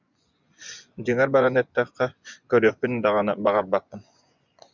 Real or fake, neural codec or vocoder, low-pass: fake; vocoder, 24 kHz, 100 mel bands, Vocos; 7.2 kHz